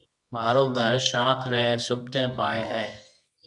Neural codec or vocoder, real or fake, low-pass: codec, 24 kHz, 0.9 kbps, WavTokenizer, medium music audio release; fake; 10.8 kHz